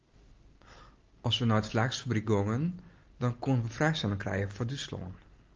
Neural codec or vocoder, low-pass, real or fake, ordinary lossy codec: none; 7.2 kHz; real; Opus, 24 kbps